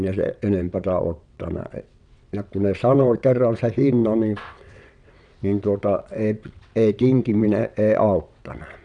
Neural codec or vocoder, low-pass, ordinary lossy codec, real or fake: vocoder, 22.05 kHz, 80 mel bands, WaveNeXt; 9.9 kHz; none; fake